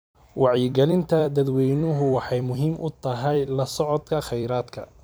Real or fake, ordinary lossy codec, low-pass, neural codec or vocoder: fake; none; none; vocoder, 44.1 kHz, 128 mel bands every 256 samples, BigVGAN v2